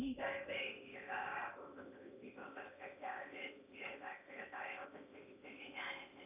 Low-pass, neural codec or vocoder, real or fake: 3.6 kHz; codec, 16 kHz in and 24 kHz out, 0.8 kbps, FocalCodec, streaming, 65536 codes; fake